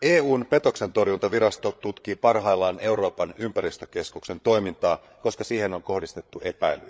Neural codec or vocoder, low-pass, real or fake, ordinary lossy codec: codec, 16 kHz, 8 kbps, FreqCodec, larger model; none; fake; none